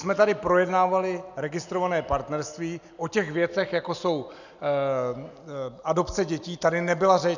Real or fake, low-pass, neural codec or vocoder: real; 7.2 kHz; none